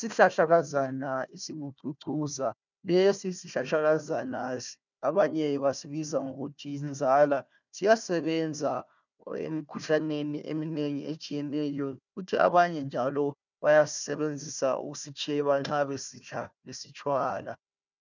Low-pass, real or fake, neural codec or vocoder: 7.2 kHz; fake; codec, 16 kHz, 1 kbps, FunCodec, trained on Chinese and English, 50 frames a second